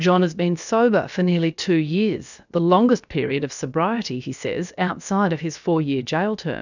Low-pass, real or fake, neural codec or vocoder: 7.2 kHz; fake; codec, 16 kHz, about 1 kbps, DyCAST, with the encoder's durations